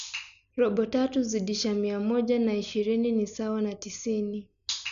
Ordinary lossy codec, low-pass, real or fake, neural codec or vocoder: none; 7.2 kHz; real; none